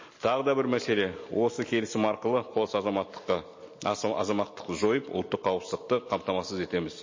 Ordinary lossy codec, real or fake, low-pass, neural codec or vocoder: MP3, 32 kbps; real; 7.2 kHz; none